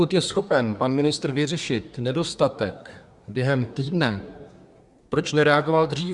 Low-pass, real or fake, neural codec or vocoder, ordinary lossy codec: 10.8 kHz; fake; codec, 24 kHz, 1 kbps, SNAC; Opus, 64 kbps